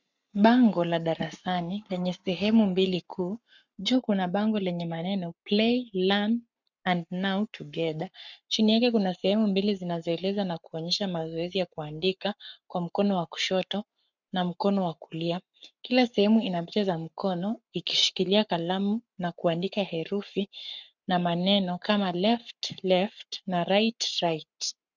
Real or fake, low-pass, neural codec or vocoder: fake; 7.2 kHz; codec, 44.1 kHz, 7.8 kbps, Pupu-Codec